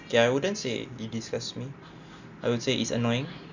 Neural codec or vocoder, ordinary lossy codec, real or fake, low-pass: none; none; real; 7.2 kHz